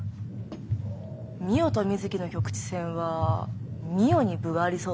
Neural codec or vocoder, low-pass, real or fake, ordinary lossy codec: none; none; real; none